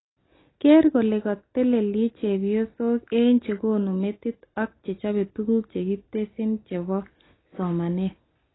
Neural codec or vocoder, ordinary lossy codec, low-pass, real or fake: none; AAC, 16 kbps; 7.2 kHz; real